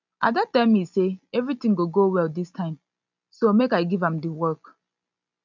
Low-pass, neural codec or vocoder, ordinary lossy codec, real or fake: 7.2 kHz; none; none; real